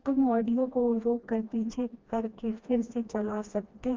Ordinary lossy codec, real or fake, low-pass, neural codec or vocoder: Opus, 24 kbps; fake; 7.2 kHz; codec, 16 kHz, 1 kbps, FreqCodec, smaller model